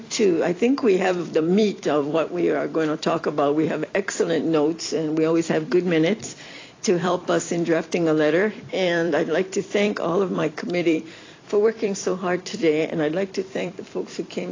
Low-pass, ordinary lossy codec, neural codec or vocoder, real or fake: 7.2 kHz; AAC, 32 kbps; vocoder, 44.1 kHz, 128 mel bands every 256 samples, BigVGAN v2; fake